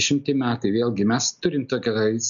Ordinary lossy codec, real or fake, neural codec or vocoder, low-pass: MP3, 64 kbps; real; none; 7.2 kHz